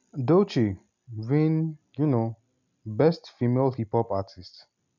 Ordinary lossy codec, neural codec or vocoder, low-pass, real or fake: none; none; 7.2 kHz; real